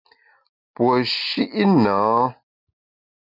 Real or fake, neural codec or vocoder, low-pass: real; none; 5.4 kHz